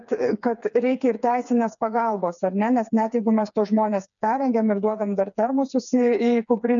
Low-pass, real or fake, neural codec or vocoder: 7.2 kHz; fake; codec, 16 kHz, 4 kbps, FreqCodec, smaller model